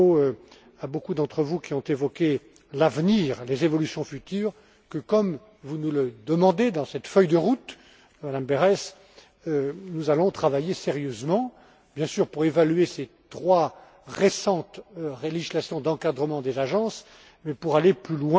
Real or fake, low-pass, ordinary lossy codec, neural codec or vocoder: real; none; none; none